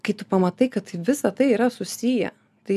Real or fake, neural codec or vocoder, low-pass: real; none; 14.4 kHz